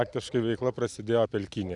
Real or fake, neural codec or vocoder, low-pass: real; none; 10.8 kHz